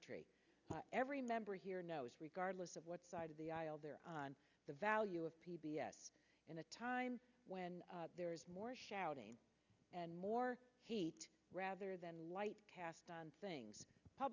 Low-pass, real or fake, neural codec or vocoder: 7.2 kHz; real; none